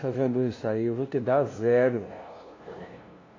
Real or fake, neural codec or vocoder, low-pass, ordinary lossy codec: fake; codec, 16 kHz, 0.5 kbps, FunCodec, trained on LibriTTS, 25 frames a second; 7.2 kHz; AAC, 32 kbps